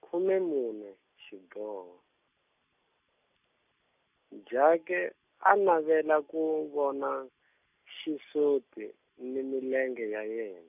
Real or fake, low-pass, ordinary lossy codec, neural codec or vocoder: real; 3.6 kHz; none; none